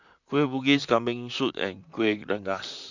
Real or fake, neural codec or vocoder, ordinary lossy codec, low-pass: fake; vocoder, 44.1 kHz, 128 mel bands, Pupu-Vocoder; none; 7.2 kHz